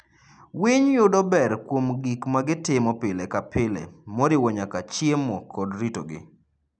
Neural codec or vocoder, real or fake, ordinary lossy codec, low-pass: none; real; none; 9.9 kHz